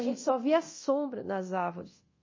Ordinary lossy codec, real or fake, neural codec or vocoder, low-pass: MP3, 32 kbps; fake; codec, 24 kHz, 0.9 kbps, DualCodec; 7.2 kHz